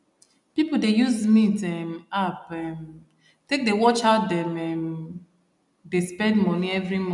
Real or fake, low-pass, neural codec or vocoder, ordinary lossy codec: real; 10.8 kHz; none; none